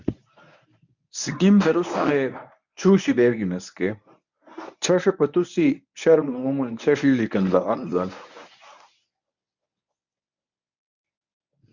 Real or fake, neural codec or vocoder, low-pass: fake; codec, 24 kHz, 0.9 kbps, WavTokenizer, medium speech release version 1; 7.2 kHz